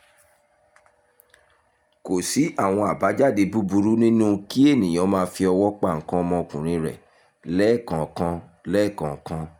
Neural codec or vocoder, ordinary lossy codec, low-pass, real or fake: none; none; 19.8 kHz; real